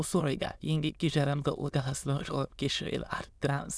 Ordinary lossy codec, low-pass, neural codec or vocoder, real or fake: none; none; autoencoder, 22.05 kHz, a latent of 192 numbers a frame, VITS, trained on many speakers; fake